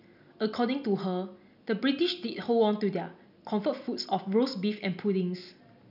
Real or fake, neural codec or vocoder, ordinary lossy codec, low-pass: real; none; none; 5.4 kHz